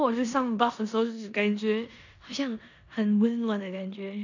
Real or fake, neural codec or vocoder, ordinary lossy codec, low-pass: fake; codec, 16 kHz in and 24 kHz out, 0.9 kbps, LongCat-Audio-Codec, four codebook decoder; none; 7.2 kHz